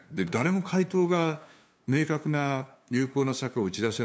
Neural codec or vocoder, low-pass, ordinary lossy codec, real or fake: codec, 16 kHz, 2 kbps, FunCodec, trained on LibriTTS, 25 frames a second; none; none; fake